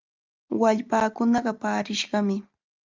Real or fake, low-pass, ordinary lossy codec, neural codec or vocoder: real; 7.2 kHz; Opus, 24 kbps; none